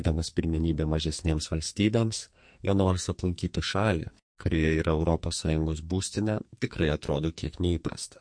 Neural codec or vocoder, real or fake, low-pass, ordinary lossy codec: codec, 44.1 kHz, 2.6 kbps, SNAC; fake; 9.9 kHz; MP3, 48 kbps